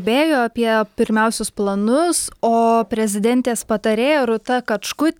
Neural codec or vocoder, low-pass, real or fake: none; 19.8 kHz; real